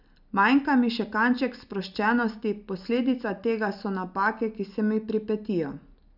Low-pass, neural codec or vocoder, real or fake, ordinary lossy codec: 5.4 kHz; none; real; none